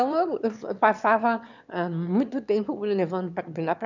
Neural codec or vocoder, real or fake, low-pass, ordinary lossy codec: autoencoder, 22.05 kHz, a latent of 192 numbers a frame, VITS, trained on one speaker; fake; 7.2 kHz; none